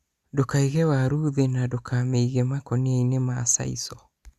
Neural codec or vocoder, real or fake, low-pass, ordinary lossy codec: none; real; 14.4 kHz; Opus, 64 kbps